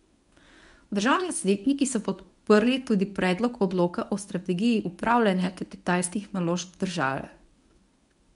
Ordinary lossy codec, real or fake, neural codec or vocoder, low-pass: none; fake; codec, 24 kHz, 0.9 kbps, WavTokenizer, medium speech release version 1; 10.8 kHz